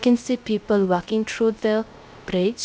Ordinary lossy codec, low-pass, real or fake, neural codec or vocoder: none; none; fake; codec, 16 kHz, 0.3 kbps, FocalCodec